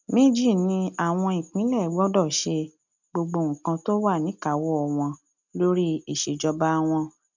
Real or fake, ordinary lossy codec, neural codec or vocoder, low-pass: real; none; none; 7.2 kHz